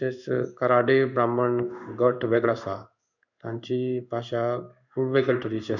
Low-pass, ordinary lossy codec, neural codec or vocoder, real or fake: 7.2 kHz; none; codec, 16 kHz in and 24 kHz out, 1 kbps, XY-Tokenizer; fake